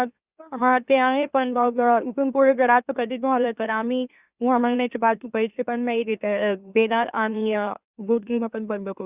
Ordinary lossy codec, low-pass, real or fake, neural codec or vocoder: Opus, 64 kbps; 3.6 kHz; fake; autoencoder, 44.1 kHz, a latent of 192 numbers a frame, MeloTTS